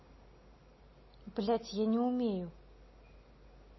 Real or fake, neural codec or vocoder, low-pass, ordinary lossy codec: real; none; 7.2 kHz; MP3, 24 kbps